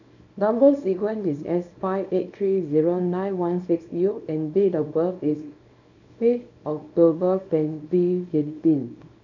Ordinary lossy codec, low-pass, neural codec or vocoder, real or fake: none; 7.2 kHz; codec, 24 kHz, 0.9 kbps, WavTokenizer, small release; fake